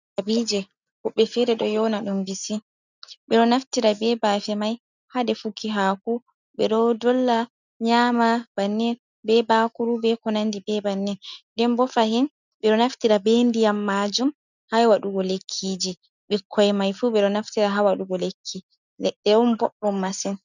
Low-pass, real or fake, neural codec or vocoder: 7.2 kHz; real; none